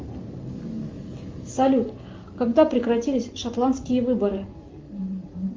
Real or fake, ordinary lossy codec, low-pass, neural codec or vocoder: real; Opus, 32 kbps; 7.2 kHz; none